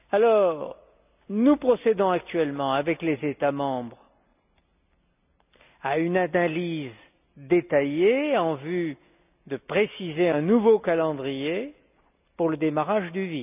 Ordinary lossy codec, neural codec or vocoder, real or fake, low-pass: none; none; real; 3.6 kHz